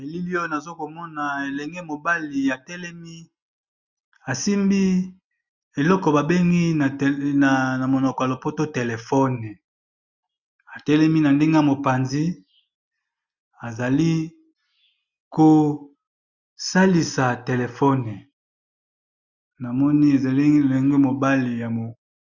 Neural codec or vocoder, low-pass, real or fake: none; 7.2 kHz; real